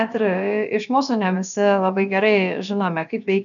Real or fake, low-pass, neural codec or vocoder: fake; 7.2 kHz; codec, 16 kHz, about 1 kbps, DyCAST, with the encoder's durations